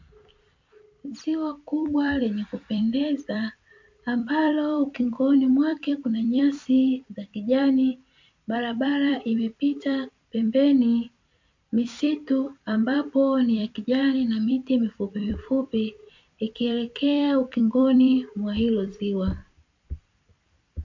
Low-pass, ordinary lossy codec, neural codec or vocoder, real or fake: 7.2 kHz; MP3, 48 kbps; vocoder, 44.1 kHz, 128 mel bands every 256 samples, BigVGAN v2; fake